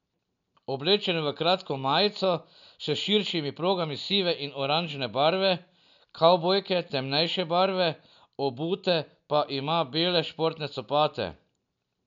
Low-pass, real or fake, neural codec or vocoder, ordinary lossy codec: 7.2 kHz; real; none; none